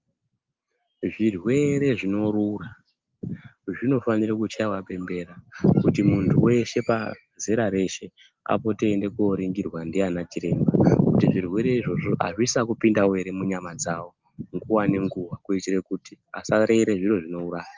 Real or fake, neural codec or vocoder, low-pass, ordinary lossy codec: real; none; 7.2 kHz; Opus, 32 kbps